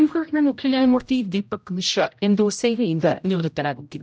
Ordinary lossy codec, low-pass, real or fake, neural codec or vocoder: none; none; fake; codec, 16 kHz, 0.5 kbps, X-Codec, HuBERT features, trained on general audio